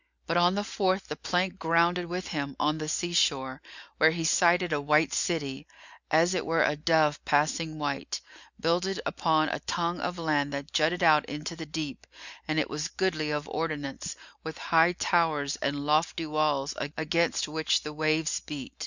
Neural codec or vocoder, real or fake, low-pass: none; real; 7.2 kHz